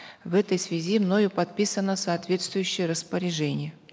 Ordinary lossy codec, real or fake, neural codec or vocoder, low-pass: none; real; none; none